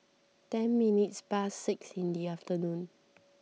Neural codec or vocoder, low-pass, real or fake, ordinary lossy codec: none; none; real; none